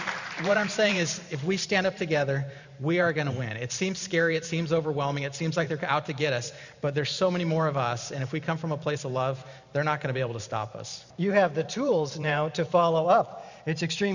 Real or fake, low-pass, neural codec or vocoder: fake; 7.2 kHz; vocoder, 44.1 kHz, 128 mel bands every 512 samples, BigVGAN v2